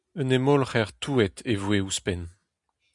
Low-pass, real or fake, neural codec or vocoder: 10.8 kHz; real; none